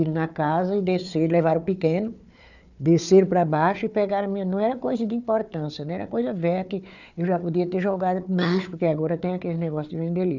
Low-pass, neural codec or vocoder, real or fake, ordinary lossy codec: 7.2 kHz; codec, 16 kHz, 4 kbps, FunCodec, trained on Chinese and English, 50 frames a second; fake; none